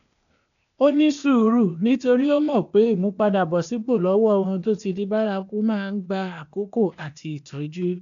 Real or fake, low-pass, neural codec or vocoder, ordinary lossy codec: fake; 7.2 kHz; codec, 16 kHz, 0.8 kbps, ZipCodec; none